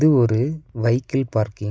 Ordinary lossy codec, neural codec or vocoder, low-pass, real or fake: none; none; none; real